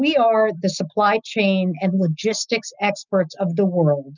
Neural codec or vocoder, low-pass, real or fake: none; 7.2 kHz; real